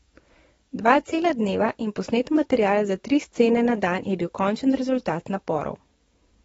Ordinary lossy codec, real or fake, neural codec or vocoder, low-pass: AAC, 24 kbps; fake; codec, 44.1 kHz, 7.8 kbps, DAC; 19.8 kHz